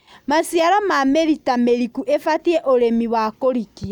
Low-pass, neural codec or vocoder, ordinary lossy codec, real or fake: 19.8 kHz; none; none; real